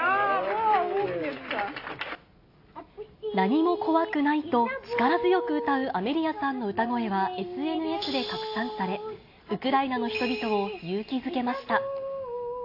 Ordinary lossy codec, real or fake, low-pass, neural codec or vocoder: AAC, 32 kbps; real; 5.4 kHz; none